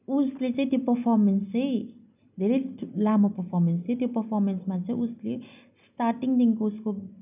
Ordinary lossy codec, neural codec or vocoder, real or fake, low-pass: none; none; real; 3.6 kHz